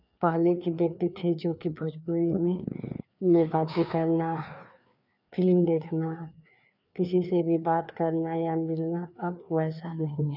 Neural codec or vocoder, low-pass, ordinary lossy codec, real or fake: codec, 16 kHz, 2 kbps, FreqCodec, larger model; 5.4 kHz; none; fake